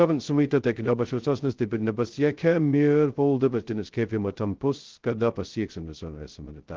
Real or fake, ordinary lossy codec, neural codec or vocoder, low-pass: fake; Opus, 16 kbps; codec, 16 kHz, 0.2 kbps, FocalCodec; 7.2 kHz